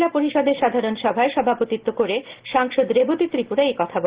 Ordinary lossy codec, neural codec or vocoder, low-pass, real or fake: Opus, 16 kbps; none; 3.6 kHz; real